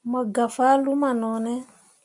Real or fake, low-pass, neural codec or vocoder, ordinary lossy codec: real; 10.8 kHz; none; MP3, 96 kbps